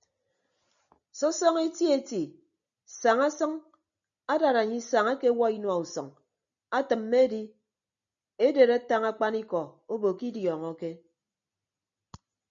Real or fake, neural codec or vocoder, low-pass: real; none; 7.2 kHz